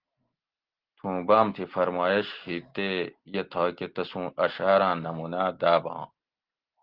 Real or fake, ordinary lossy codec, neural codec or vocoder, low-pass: real; Opus, 16 kbps; none; 5.4 kHz